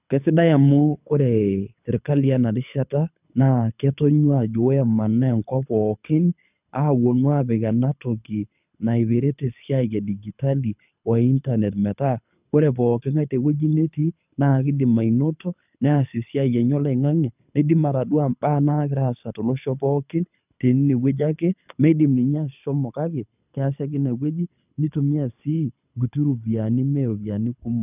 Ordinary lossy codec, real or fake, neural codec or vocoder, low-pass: none; fake; codec, 24 kHz, 6 kbps, HILCodec; 3.6 kHz